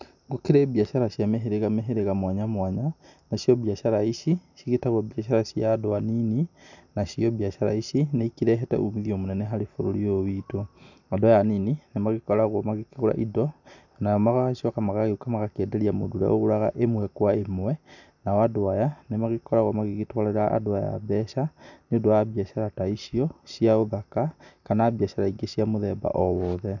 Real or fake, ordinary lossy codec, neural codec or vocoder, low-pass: real; none; none; 7.2 kHz